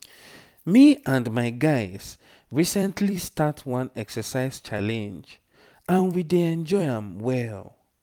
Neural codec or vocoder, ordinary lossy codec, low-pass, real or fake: vocoder, 44.1 kHz, 128 mel bands every 256 samples, BigVGAN v2; none; 19.8 kHz; fake